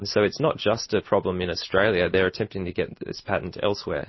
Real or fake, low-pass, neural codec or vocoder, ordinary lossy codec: real; 7.2 kHz; none; MP3, 24 kbps